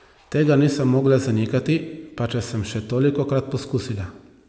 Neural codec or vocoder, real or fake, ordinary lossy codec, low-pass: none; real; none; none